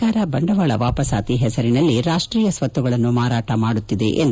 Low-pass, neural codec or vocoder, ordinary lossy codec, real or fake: none; none; none; real